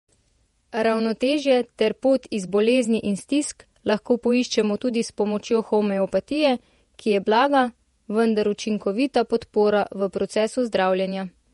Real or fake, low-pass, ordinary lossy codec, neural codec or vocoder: fake; 19.8 kHz; MP3, 48 kbps; vocoder, 48 kHz, 128 mel bands, Vocos